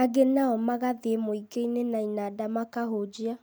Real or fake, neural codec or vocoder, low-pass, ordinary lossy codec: real; none; none; none